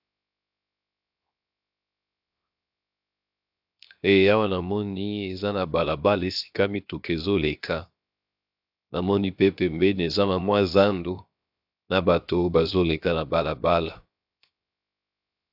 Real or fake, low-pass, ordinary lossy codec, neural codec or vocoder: fake; 5.4 kHz; AAC, 48 kbps; codec, 16 kHz, 0.7 kbps, FocalCodec